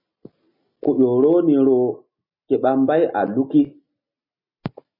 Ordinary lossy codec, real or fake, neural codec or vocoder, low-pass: MP3, 24 kbps; real; none; 5.4 kHz